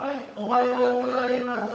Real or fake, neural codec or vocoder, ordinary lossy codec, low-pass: fake; codec, 16 kHz, 16 kbps, FunCodec, trained on LibriTTS, 50 frames a second; none; none